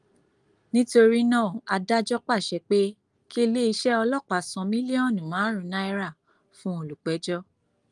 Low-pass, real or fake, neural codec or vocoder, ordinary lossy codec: 10.8 kHz; real; none; Opus, 32 kbps